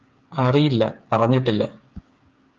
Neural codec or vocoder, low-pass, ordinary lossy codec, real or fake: codec, 16 kHz, 8 kbps, FreqCodec, smaller model; 7.2 kHz; Opus, 24 kbps; fake